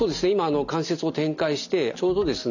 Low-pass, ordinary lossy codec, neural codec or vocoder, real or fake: 7.2 kHz; none; none; real